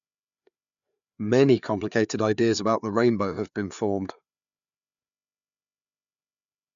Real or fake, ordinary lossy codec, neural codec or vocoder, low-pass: fake; MP3, 96 kbps; codec, 16 kHz, 4 kbps, FreqCodec, larger model; 7.2 kHz